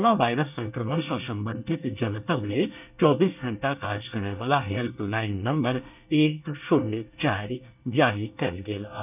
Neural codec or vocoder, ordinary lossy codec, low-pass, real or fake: codec, 24 kHz, 1 kbps, SNAC; none; 3.6 kHz; fake